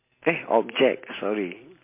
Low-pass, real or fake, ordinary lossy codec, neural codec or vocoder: 3.6 kHz; real; MP3, 24 kbps; none